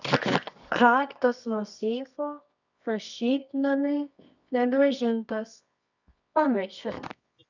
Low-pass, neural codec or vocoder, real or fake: 7.2 kHz; codec, 24 kHz, 0.9 kbps, WavTokenizer, medium music audio release; fake